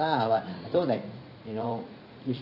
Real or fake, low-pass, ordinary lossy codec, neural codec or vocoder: fake; 5.4 kHz; none; vocoder, 44.1 kHz, 80 mel bands, Vocos